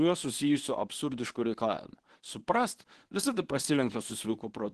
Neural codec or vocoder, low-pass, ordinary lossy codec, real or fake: codec, 24 kHz, 0.9 kbps, WavTokenizer, medium speech release version 1; 10.8 kHz; Opus, 16 kbps; fake